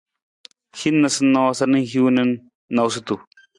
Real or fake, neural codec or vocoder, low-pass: real; none; 10.8 kHz